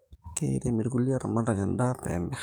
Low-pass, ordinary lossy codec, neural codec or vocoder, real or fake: none; none; codec, 44.1 kHz, 7.8 kbps, DAC; fake